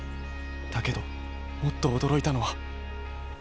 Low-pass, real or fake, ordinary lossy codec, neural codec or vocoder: none; real; none; none